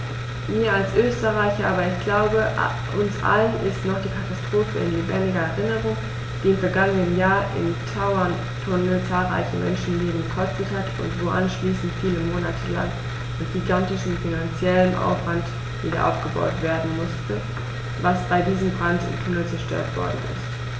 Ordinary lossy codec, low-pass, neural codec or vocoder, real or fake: none; none; none; real